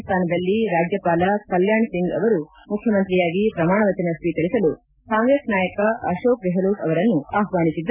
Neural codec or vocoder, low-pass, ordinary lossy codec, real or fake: none; 3.6 kHz; none; real